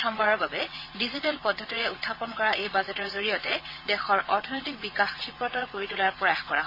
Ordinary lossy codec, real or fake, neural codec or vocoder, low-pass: MP3, 24 kbps; fake; vocoder, 22.05 kHz, 80 mel bands, WaveNeXt; 5.4 kHz